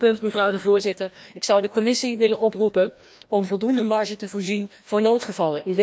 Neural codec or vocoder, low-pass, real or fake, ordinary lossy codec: codec, 16 kHz, 1 kbps, FreqCodec, larger model; none; fake; none